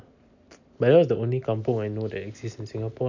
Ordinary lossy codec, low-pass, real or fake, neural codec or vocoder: none; 7.2 kHz; real; none